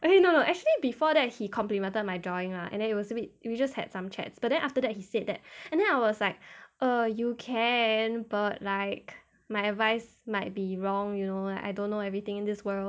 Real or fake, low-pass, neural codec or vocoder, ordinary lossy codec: real; none; none; none